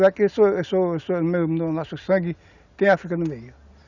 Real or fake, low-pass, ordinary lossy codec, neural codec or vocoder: real; 7.2 kHz; none; none